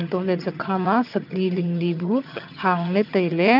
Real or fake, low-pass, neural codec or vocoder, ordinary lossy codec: fake; 5.4 kHz; vocoder, 22.05 kHz, 80 mel bands, HiFi-GAN; none